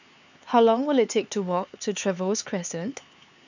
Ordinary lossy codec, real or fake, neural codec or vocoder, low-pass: none; fake; codec, 16 kHz, 4 kbps, X-Codec, HuBERT features, trained on LibriSpeech; 7.2 kHz